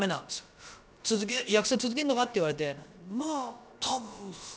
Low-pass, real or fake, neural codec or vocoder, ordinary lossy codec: none; fake; codec, 16 kHz, about 1 kbps, DyCAST, with the encoder's durations; none